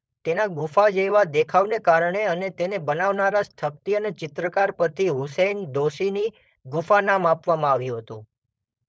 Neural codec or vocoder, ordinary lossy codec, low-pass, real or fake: codec, 16 kHz, 4.8 kbps, FACodec; none; none; fake